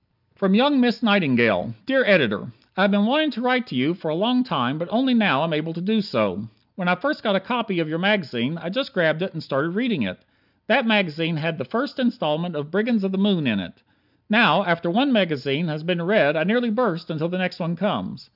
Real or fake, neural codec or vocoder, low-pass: real; none; 5.4 kHz